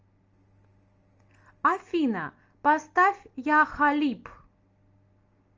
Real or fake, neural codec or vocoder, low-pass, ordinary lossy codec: real; none; 7.2 kHz; Opus, 24 kbps